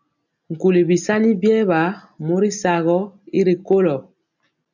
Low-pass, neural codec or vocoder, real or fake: 7.2 kHz; none; real